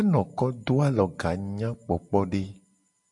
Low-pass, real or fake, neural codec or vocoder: 10.8 kHz; real; none